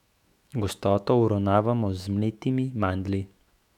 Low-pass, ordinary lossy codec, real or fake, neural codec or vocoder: 19.8 kHz; none; fake; autoencoder, 48 kHz, 128 numbers a frame, DAC-VAE, trained on Japanese speech